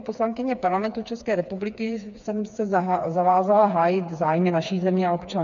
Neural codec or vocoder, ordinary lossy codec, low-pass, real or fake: codec, 16 kHz, 4 kbps, FreqCodec, smaller model; MP3, 64 kbps; 7.2 kHz; fake